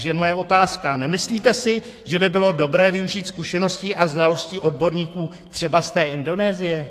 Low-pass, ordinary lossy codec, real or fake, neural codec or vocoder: 14.4 kHz; AAC, 64 kbps; fake; codec, 32 kHz, 1.9 kbps, SNAC